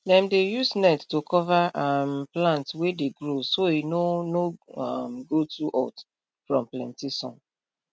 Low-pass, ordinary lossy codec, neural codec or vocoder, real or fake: none; none; none; real